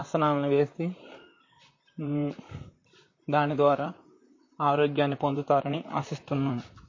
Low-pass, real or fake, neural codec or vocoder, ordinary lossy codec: 7.2 kHz; fake; vocoder, 44.1 kHz, 128 mel bands, Pupu-Vocoder; MP3, 32 kbps